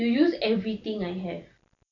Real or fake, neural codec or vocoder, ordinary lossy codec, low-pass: real; none; none; 7.2 kHz